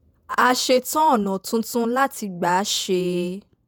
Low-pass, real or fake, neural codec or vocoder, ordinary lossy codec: none; fake; vocoder, 48 kHz, 128 mel bands, Vocos; none